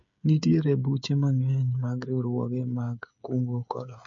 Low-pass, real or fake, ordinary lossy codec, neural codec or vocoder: 7.2 kHz; fake; MP3, 48 kbps; codec, 16 kHz, 8 kbps, FreqCodec, smaller model